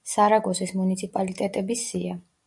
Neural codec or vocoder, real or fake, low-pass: none; real; 10.8 kHz